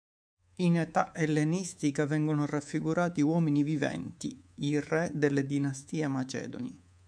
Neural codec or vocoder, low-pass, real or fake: codec, 24 kHz, 3.1 kbps, DualCodec; 9.9 kHz; fake